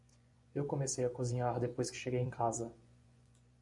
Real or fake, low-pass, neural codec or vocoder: fake; 10.8 kHz; vocoder, 24 kHz, 100 mel bands, Vocos